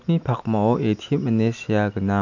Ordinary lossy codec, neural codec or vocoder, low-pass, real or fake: none; none; 7.2 kHz; real